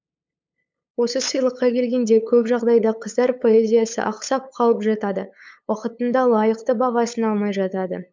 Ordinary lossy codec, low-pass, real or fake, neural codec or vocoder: MP3, 64 kbps; 7.2 kHz; fake; codec, 16 kHz, 8 kbps, FunCodec, trained on LibriTTS, 25 frames a second